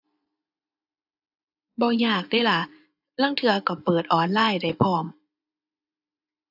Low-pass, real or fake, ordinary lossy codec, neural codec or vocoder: 5.4 kHz; fake; AAC, 48 kbps; vocoder, 24 kHz, 100 mel bands, Vocos